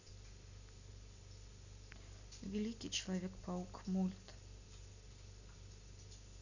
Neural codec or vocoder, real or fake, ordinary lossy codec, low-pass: none; real; none; 7.2 kHz